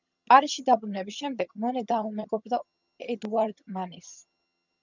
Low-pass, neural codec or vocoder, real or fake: 7.2 kHz; vocoder, 22.05 kHz, 80 mel bands, HiFi-GAN; fake